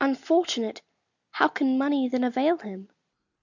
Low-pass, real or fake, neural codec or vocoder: 7.2 kHz; real; none